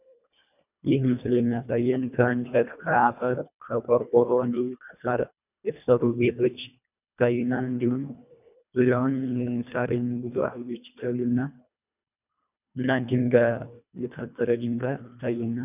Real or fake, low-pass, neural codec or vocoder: fake; 3.6 kHz; codec, 24 kHz, 1.5 kbps, HILCodec